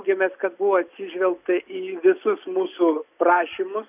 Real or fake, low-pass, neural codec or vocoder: real; 3.6 kHz; none